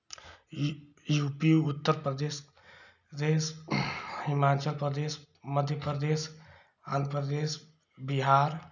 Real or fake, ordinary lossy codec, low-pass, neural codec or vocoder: real; none; 7.2 kHz; none